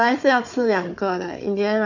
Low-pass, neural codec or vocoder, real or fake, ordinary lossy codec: 7.2 kHz; codec, 16 kHz, 4 kbps, FunCodec, trained on Chinese and English, 50 frames a second; fake; none